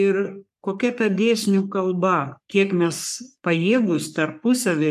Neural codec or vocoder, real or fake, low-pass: codec, 44.1 kHz, 3.4 kbps, Pupu-Codec; fake; 14.4 kHz